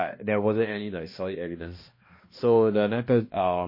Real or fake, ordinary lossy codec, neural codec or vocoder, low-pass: fake; MP3, 24 kbps; codec, 16 kHz, 1 kbps, X-Codec, HuBERT features, trained on balanced general audio; 5.4 kHz